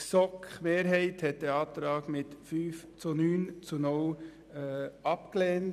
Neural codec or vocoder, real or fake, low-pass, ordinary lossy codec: vocoder, 44.1 kHz, 128 mel bands every 256 samples, BigVGAN v2; fake; 14.4 kHz; none